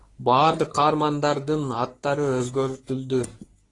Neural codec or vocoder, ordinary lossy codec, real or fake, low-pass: codec, 44.1 kHz, 3.4 kbps, Pupu-Codec; AAC, 32 kbps; fake; 10.8 kHz